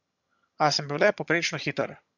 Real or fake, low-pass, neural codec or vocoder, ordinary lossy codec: fake; 7.2 kHz; vocoder, 22.05 kHz, 80 mel bands, HiFi-GAN; none